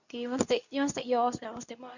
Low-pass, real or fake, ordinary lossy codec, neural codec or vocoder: 7.2 kHz; fake; none; codec, 24 kHz, 0.9 kbps, WavTokenizer, medium speech release version 2